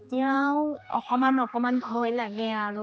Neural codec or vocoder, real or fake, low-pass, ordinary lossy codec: codec, 16 kHz, 2 kbps, X-Codec, HuBERT features, trained on general audio; fake; none; none